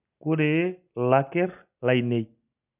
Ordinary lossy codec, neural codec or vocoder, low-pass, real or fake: none; none; 3.6 kHz; real